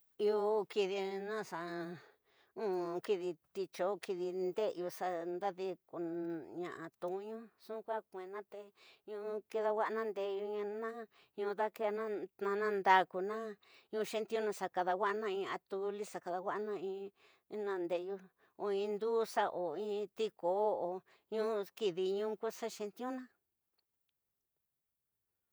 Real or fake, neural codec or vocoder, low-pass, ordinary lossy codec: fake; vocoder, 48 kHz, 128 mel bands, Vocos; none; none